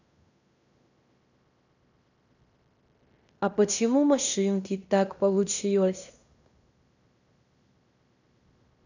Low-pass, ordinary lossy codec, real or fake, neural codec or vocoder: 7.2 kHz; none; fake; codec, 16 kHz in and 24 kHz out, 0.9 kbps, LongCat-Audio-Codec, fine tuned four codebook decoder